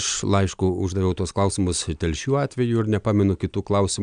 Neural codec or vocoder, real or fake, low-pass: none; real; 9.9 kHz